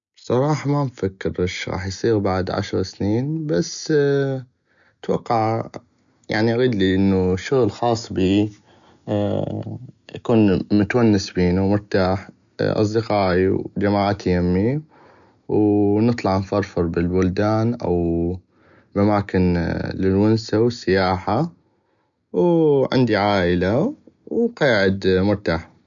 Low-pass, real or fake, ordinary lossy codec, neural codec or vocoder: 7.2 kHz; real; none; none